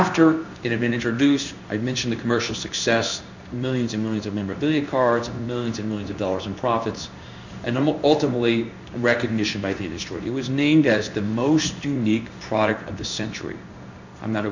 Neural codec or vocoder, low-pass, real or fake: codec, 16 kHz in and 24 kHz out, 1 kbps, XY-Tokenizer; 7.2 kHz; fake